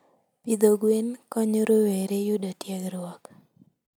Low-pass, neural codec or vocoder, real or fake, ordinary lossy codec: none; none; real; none